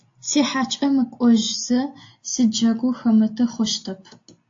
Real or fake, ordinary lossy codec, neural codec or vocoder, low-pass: real; AAC, 48 kbps; none; 7.2 kHz